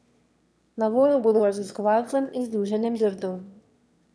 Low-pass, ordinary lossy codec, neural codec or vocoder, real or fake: none; none; autoencoder, 22.05 kHz, a latent of 192 numbers a frame, VITS, trained on one speaker; fake